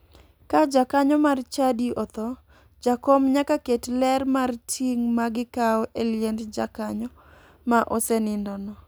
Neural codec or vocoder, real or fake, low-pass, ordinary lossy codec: none; real; none; none